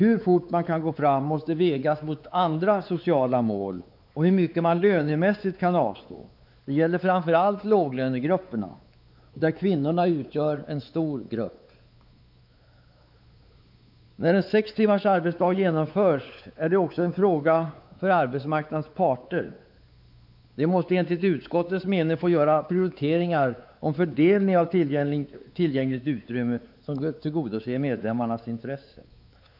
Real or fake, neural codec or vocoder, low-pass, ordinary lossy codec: fake; codec, 16 kHz, 4 kbps, X-Codec, WavLM features, trained on Multilingual LibriSpeech; 5.4 kHz; none